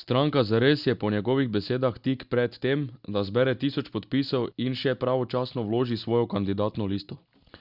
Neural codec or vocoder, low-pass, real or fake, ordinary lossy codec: none; 5.4 kHz; real; Opus, 64 kbps